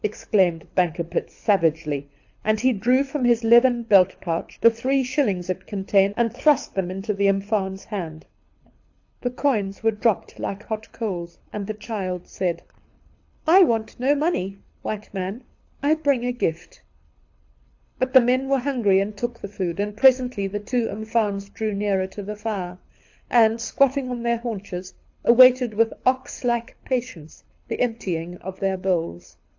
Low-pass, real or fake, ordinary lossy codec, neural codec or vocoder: 7.2 kHz; fake; AAC, 48 kbps; codec, 24 kHz, 6 kbps, HILCodec